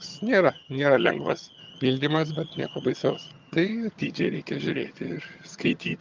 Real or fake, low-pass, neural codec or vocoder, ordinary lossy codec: fake; 7.2 kHz; vocoder, 22.05 kHz, 80 mel bands, HiFi-GAN; Opus, 24 kbps